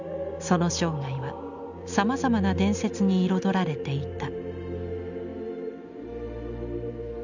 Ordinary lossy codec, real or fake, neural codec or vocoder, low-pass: none; real; none; 7.2 kHz